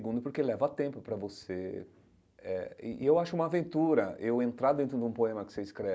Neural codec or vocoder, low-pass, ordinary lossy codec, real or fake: none; none; none; real